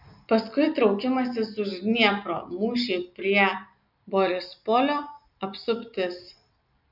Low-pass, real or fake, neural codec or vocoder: 5.4 kHz; real; none